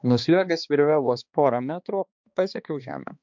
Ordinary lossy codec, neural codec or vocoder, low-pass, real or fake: MP3, 64 kbps; codec, 16 kHz, 2 kbps, X-Codec, HuBERT features, trained on balanced general audio; 7.2 kHz; fake